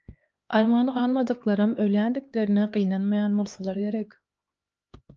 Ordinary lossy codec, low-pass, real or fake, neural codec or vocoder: Opus, 24 kbps; 7.2 kHz; fake; codec, 16 kHz, 2 kbps, X-Codec, HuBERT features, trained on LibriSpeech